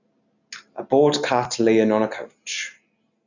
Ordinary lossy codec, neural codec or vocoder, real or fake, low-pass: none; none; real; 7.2 kHz